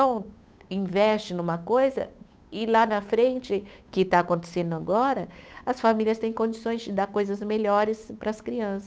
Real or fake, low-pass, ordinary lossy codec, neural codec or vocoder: fake; none; none; codec, 16 kHz, 8 kbps, FunCodec, trained on Chinese and English, 25 frames a second